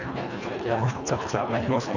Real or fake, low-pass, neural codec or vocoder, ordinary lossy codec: fake; 7.2 kHz; codec, 24 kHz, 1.5 kbps, HILCodec; none